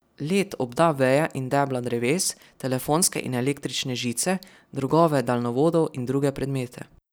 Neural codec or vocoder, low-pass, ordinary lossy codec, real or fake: none; none; none; real